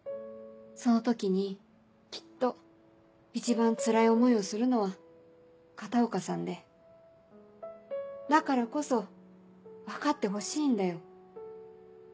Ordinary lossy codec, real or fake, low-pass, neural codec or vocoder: none; real; none; none